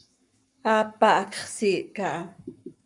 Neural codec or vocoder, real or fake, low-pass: codec, 44.1 kHz, 7.8 kbps, Pupu-Codec; fake; 10.8 kHz